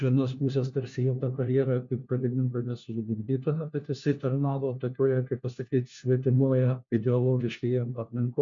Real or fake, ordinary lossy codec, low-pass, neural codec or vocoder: fake; MP3, 64 kbps; 7.2 kHz; codec, 16 kHz, 1 kbps, FunCodec, trained on LibriTTS, 50 frames a second